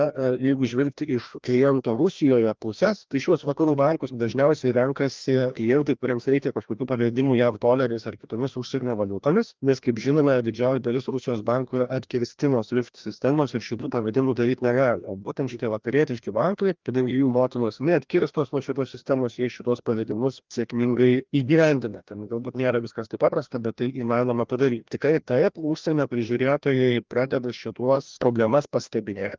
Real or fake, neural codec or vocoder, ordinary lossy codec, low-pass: fake; codec, 16 kHz, 1 kbps, FreqCodec, larger model; Opus, 24 kbps; 7.2 kHz